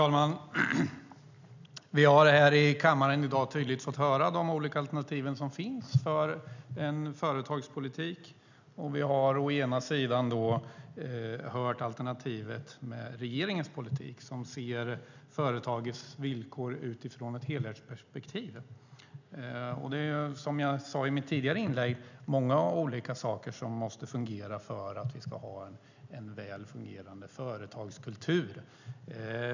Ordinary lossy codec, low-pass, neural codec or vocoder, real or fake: none; 7.2 kHz; none; real